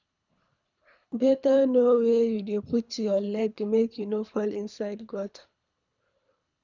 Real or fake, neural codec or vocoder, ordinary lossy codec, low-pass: fake; codec, 24 kHz, 3 kbps, HILCodec; none; 7.2 kHz